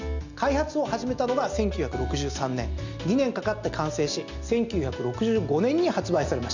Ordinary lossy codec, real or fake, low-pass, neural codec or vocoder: none; real; 7.2 kHz; none